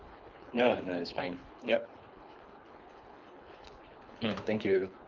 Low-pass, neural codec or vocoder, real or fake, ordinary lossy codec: 7.2 kHz; codec, 24 kHz, 3 kbps, HILCodec; fake; Opus, 24 kbps